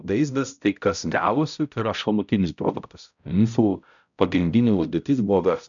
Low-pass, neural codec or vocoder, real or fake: 7.2 kHz; codec, 16 kHz, 0.5 kbps, X-Codec, HuBERT features, trained on balanced general audio; fake